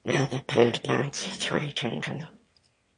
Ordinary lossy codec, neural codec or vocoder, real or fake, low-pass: MP3, 48 kbps; autoencoder, 22.05 kHz, a latent of 192 numbers a frame, VITS, trained on one speaker; fake; 9.9 kHz